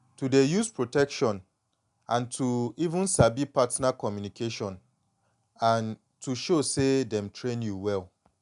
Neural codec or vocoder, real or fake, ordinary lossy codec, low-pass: none; real; none; 10.8 kHz